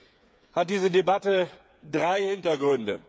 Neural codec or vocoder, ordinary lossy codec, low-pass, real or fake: codec, 16 kHz, 8 kbps, FreqCodec, smaller model; none; none; fake